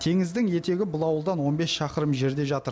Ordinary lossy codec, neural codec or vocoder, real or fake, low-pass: none; none; real; none